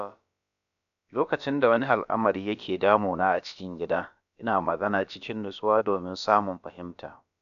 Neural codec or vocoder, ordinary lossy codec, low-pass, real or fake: codec, 16 kHz, about 1 kbps, DyCAST, with the encoder's durations; none; 7.2 kHz; fake